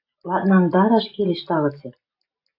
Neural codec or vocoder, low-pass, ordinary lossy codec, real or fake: none; 5.4 kHz; AAC, 48 kbps; real